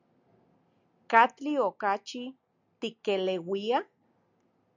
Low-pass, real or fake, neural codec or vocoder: 7.2 kHz; real; none